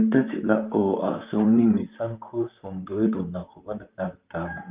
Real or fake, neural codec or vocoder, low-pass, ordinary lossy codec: fake; vocoder, 44.1 kHz, 128 mel bands, Pupu-Vocoder; 3.6 kHz; Opus, 24 kbps